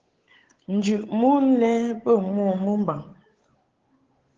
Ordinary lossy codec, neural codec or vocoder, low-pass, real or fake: Opus, 16 kbps; codec, 16 kHz, 8 kbps, FunCodec, trained on Chinese and English, 25 frames a second; 7.2 kHz; fake